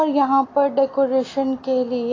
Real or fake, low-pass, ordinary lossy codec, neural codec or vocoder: real; 7.2 kHz; AAC, 32 kbps; none